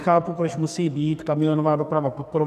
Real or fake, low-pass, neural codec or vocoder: fake; 14.4 kHz; codec, 44.1 kHz, 2.6 kbps, SNAC